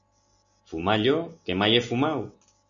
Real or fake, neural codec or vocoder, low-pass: real; none; 7.2 kHz